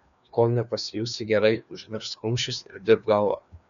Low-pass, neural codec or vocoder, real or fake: 7.2 kHz; codec, 16 kHz, 2 kbps, FreqCodec, larger model; fake